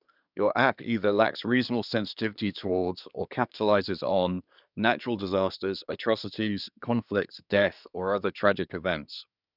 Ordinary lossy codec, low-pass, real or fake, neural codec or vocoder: none; 5.4 kHz; fake; codec, 24 kHz, 1 kbps, SNAC